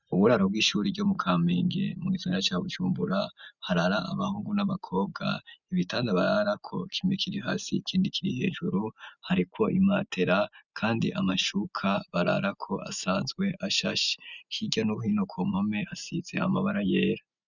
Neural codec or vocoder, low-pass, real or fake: none; 7.2 kHz; real